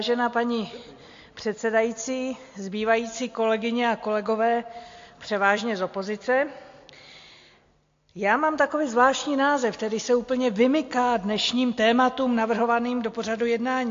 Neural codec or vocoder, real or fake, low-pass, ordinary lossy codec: none; real; 7.2 kHz; AAC, 48 kbps